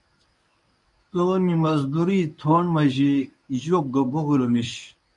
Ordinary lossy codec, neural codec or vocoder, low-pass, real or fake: MP3, 96 kbps; codec, 24 kHz, 0.9 kbps, WavTokenizer, medium speech release version 1; 10.8 kHz; fake